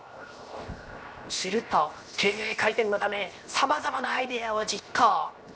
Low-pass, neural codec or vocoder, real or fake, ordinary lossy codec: none; codec, 16 kHz, 0.7 kbps, FocalCodec; fake; none